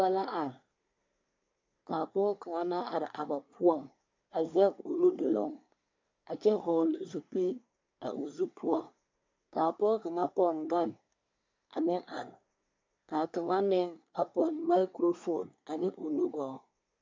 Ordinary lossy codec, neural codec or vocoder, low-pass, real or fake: MP3, 64 kbps; codec, 24 kHz, 1 kbps, SNAC; 7.2 kHz; fake